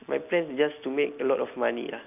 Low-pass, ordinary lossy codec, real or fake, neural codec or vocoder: 3.6 kHz; none; real; none